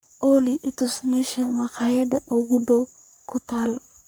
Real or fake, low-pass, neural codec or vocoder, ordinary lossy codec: fake; none; codec, 44.1 kHz, 3.4 kbps, Pupu-Codec; none